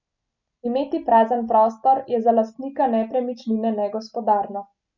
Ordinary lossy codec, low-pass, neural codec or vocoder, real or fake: none; 7.2 kHz; none; real